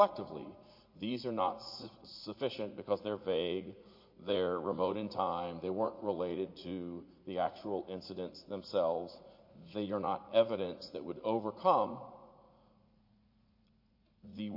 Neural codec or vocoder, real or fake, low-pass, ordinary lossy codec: vocoder, 44.1 kHz, 80 mel bands, Vocos; fake; 5.4 kHz; MP3, 32 kbps